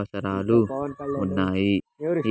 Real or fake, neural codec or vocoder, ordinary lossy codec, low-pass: real; none; none; none